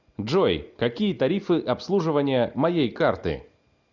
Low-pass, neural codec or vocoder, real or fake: 7.2 kHz; none; real